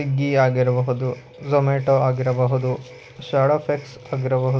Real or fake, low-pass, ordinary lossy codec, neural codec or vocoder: real; none; none; none